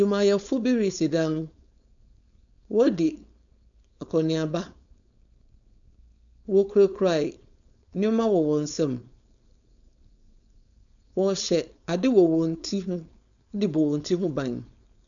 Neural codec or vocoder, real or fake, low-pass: codec, 16 kHz, 4.8 kbps, FACodec; fake; 7.2 kHz